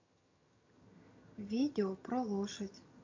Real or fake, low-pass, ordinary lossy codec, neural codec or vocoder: fake; 7.2 kHz; MP3, 48 kbps; vocoder, 22.05 kHz, 80 mel bands, HiFi-GAN